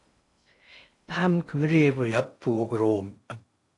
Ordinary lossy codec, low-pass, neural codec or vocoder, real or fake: AAC, 48 kbps; 10.8 kHz; codec, 16 kHz in and 24 kHz out, 0.6 kbps, FocalCodec, streaming, 2048 codes; fake